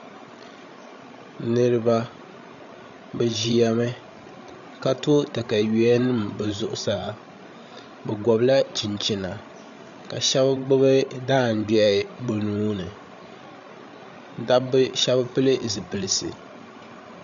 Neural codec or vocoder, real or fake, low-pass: codec, 16 kHz, 16 kbps, FreqCodec, larger model; fake; 7.2 kHz